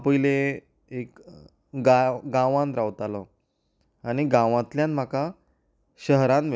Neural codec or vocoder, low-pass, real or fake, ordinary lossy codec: none; none; real; none